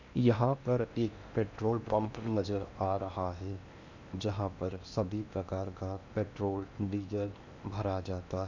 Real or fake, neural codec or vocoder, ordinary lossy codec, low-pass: fake; codec, 16 kHz in and 24 kHz out, 0.8 kbps, FocalCodec, streaming, 65536 codes; none; 7.2 kHz